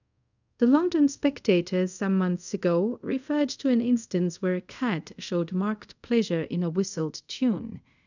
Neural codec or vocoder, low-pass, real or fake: codec, 24 kHz, 0.5 kbps, DualCodec; 7.2 kHz; fake